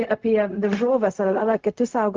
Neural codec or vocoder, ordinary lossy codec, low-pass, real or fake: codec, 16 kHz, 0.4 kbps, LongCat-Audio-Codec; Opus, 24 kbps; 7.2 kHz; fake